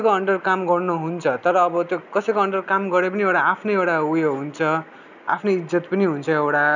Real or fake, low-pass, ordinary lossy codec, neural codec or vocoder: real; 7.2 kHz; none; none